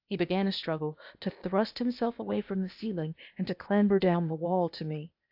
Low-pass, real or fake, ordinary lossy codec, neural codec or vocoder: 5.4 kHz; fake; AAC, 48 kbps; codec, 16 kHz, 0.8 kbps, ZipCodec